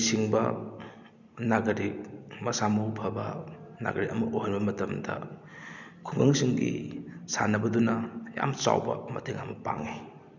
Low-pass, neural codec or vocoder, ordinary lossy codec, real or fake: 7.2 kHz; none; none; real